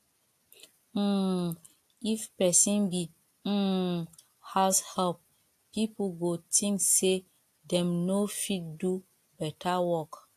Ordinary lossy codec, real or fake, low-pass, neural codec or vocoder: AAC, 64 kbps; real; 14.4 kHz; none